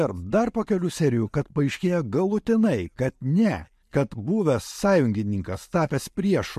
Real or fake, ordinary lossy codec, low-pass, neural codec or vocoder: real; MP3, 64 kbps; 14.4 kHz; none